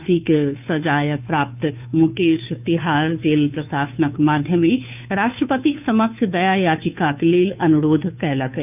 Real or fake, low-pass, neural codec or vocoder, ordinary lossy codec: fake; 3.6 kHz; codec, 16 kHz, 2 kbps, FunCodec, trained on Chinese and English, 25 frames a second; none